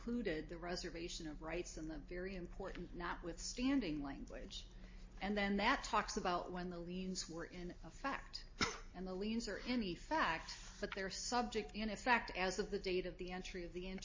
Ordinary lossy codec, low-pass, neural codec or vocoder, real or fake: MP3, 32 kbps; 7.2 kHz; none; real